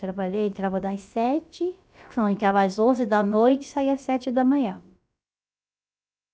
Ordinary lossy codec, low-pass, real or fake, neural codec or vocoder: none; none; fake; codec, 16 kHz, about 1 kbps, DyCAST, with the encoder's durations